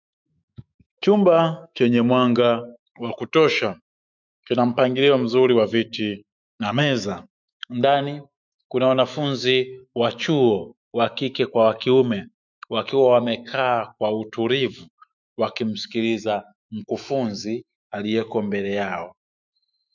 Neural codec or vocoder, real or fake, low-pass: autoencoder, 48 kHz, 128 numbers a frame, DAC-VAE, trained on Japanese speech; fake; 7.2 kHz